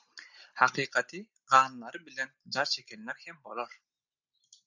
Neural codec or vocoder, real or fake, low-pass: none; real; 7.2 kHz